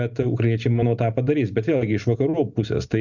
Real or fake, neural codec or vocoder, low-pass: real; none; 7.2 kHz